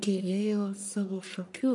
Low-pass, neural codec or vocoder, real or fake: 10.8 kHz; codec, 44.1 kHz, 1.7 kbps, Pupu-Codec; fake